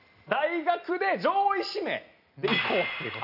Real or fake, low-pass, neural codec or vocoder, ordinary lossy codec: real; 5.4 kHz; none; none